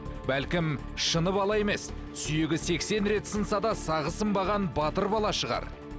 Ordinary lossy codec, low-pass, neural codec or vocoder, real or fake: none; none; none; real